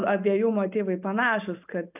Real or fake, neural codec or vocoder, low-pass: real; none; 3.6 kHz